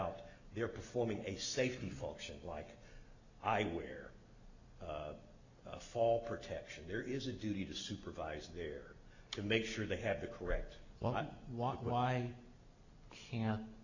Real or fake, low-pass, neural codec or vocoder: real; 7.2 kHz; none